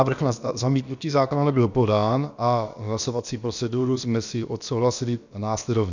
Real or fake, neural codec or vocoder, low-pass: fake; codec, 16 kHz, about 1 kbps, DyCAST, with the encoder's durations; 7.2 kHz